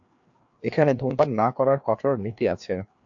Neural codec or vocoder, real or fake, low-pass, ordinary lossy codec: codec, 16 kHz, 2 kbps, FreqCodec, larger model; fake; 7.2 kHz; AAC, 64 kbps